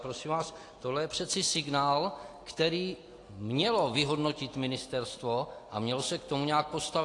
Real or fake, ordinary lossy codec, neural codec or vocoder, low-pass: real; AAC, 48 kbps; none; 10.8 kHz